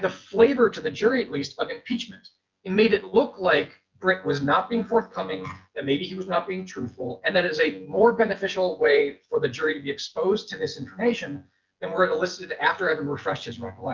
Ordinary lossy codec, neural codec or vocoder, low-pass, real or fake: Opus, 24 kbps; vocoder, 24 kHz, 100 mel bands, Vocos; 7.2 kHz; fake